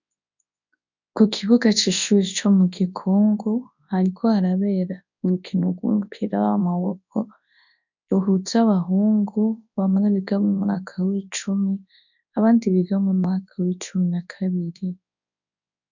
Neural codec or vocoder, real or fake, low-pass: codec, 24 kHz, 0.9 kbps, WavTokenizer, large speech release; fake; 7.2 kHz